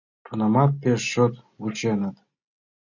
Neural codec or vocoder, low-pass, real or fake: none; 7.2 kHz; real